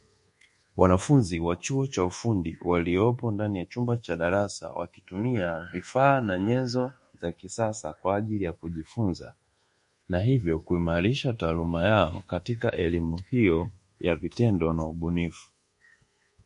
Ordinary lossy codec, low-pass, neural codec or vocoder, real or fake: MP3, 48 kbps; 10.8 kHz; codec, 24 kHz, 1.2 kbps, DualCodec; fake